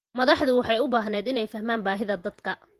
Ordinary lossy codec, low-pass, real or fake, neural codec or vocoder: Opus, 24 kbps; 14.4 kHz; fake; vocoder, 48 kHz, 128 mel bands, Vocos